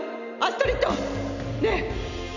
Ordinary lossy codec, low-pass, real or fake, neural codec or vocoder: none; 7.2 kHz; real; none